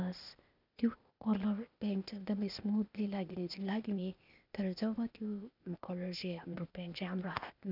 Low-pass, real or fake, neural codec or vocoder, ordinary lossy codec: 5.4 kHz; fake; codec, 16 kHz, 0.8 kbps, ZipCodec; none